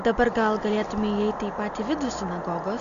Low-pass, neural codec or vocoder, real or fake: 7.2 kHz; none; real